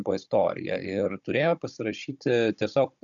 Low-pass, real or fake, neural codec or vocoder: 7.2 kHz; fake; codec, 16 kHz, 16 kbps, FunCodec, trained on Chinese and English, 50 frames a second